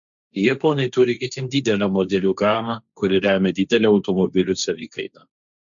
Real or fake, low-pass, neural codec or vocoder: fake; 7.2 kHz; codec, 16 kHz, 1.1 kbps, Voila-Tokenizer